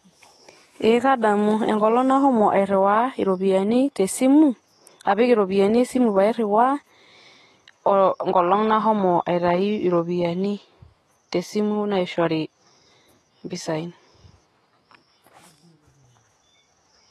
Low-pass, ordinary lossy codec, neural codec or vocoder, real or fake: 19.8 kHz; AAC, 32 kbps; autoencoder, 48 kHz, 128 numbers a frame, DAC-VAE, trained on Japanese speech; fake